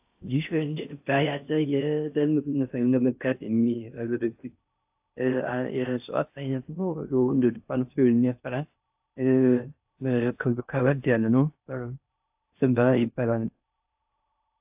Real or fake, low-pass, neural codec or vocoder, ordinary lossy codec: fake; 3.6 kHz; codec, 16 kHz in and 24 kHz out, 0.6 kbps, FocalCodec, streaming, 4096 codes; AAC, 32 kbps